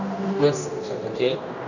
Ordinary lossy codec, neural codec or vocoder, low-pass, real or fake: none; codec, 16 kHz, 1 kbps, X-Codec, HuBERT features, trained on general audio; 7.2 kHz; fake